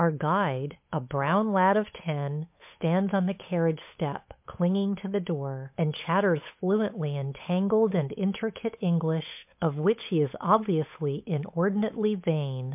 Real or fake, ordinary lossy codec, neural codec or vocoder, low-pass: fake; MP3, 32 kbps; codec, 16 kHz, 8 kbps, FunCodec, trained on Chinese and English, 25 frames a second; 3.6 kHz